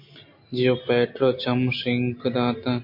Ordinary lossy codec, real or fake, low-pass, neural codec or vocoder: MP3, 32 kbps; real; 5.4 kHz; none